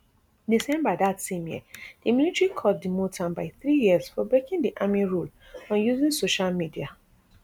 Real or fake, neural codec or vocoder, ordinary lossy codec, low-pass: real; none; none; none